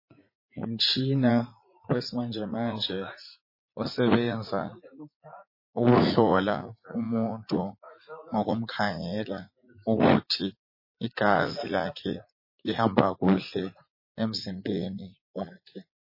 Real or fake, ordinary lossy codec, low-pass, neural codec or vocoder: fake; MP3, 24 kbps; 5.4 kHz; vocoder, 44.1 kHz, 80 mel bands, Vocos